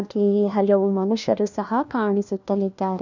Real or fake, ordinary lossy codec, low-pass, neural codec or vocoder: fake; none; 7.2 kHz; codec, 16 kHz, 1 kbps, FunCodec, trained on LibriTTS, 50 frames a second